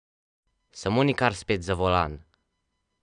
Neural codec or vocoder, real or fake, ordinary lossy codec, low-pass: none; real; none; 9.9 kHz